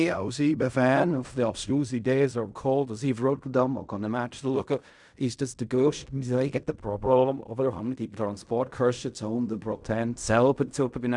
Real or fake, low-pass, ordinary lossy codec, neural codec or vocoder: fake; 10.8 kHz; none; codec, 16 kHz in and 24 kHz out, 0.4 kbps, LongCat-Audio-Codec, fine tuned four codebook decoder